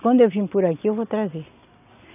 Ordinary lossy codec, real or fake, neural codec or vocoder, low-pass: none; real; none; 3.6 kHz